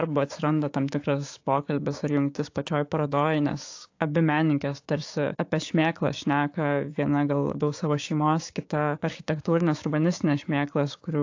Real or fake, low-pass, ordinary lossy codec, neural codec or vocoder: fake; 7.2 kHz; AAC, 48 kbps; codec, 16 kHz, 6 kbps, DAC